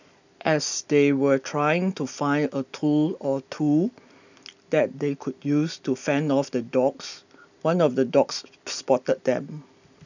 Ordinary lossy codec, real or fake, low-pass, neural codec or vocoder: none; real; 7.2 kHz; none